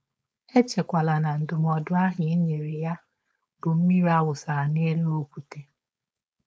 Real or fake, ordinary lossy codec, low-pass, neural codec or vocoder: fake; none; none; codec, 16 kHz, 4.8 kbps, FACodec